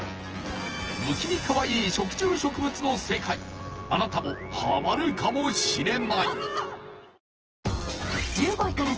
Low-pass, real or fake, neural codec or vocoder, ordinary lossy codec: 7.2 kHz; fake; vocoder, 24 kHz, 100 mel bands, Vocos; Opus, 16 kbps